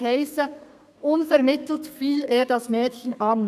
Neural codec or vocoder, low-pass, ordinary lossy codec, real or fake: codec, 32 kHz, 1.9 kbps, SNAC; 14.4 kHz; none; fake